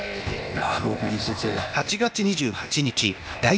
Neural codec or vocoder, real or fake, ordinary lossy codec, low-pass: codec, 16 kHz, 0.8 kbps, ZipCodec; fake; none; none